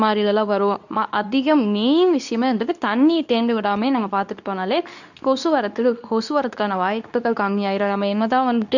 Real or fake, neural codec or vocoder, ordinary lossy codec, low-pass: fake; codec, 24 kHz, 0.9 kbps, WavTokenizer, medium speech release version 2; none; 7.2 kHz